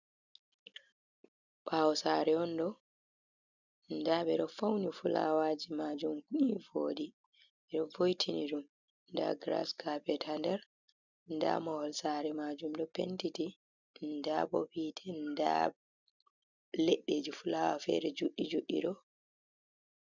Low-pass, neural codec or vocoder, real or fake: 7.2 kHz; none; real